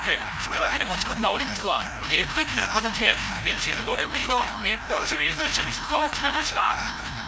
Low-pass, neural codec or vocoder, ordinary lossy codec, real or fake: none; codec, 16 kHz, 0.5 kbps, FreqCodec, larger model; none; fake